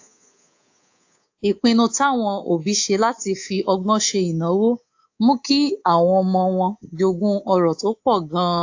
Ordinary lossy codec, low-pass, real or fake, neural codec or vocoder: AAC, 48 kbps; 7.2 kHz; fake; codec, 24 kHz, 3.1 kbps, DualCodec